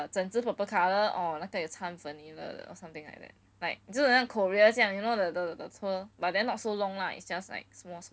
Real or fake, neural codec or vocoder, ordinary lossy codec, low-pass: real; none; none; none